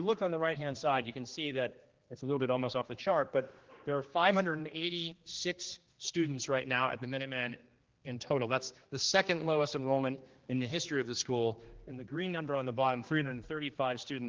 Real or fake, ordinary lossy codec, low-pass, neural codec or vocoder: fake; Opus, 16 kbps; 7.2 kHz; codec, 16 kHz, 2 kbps, X-Codec, HuBERT features, trained on general audio